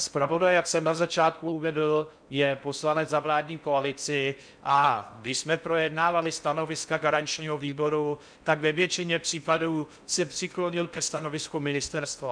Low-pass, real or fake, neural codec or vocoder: 9.9 kHz; fake; codec, 16 kHz in and 24 kHz out, 0.6 kbps, FocalCodec, streaming, 4096 codes